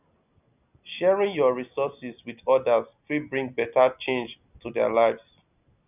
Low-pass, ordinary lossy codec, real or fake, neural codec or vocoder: 3.6 kHz; none; real; none